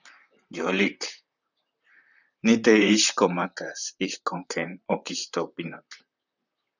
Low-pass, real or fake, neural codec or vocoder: 7.2 kHz; fake; vocoder, 44.1 kHz, 128 mel bands, Pupu-Vocoder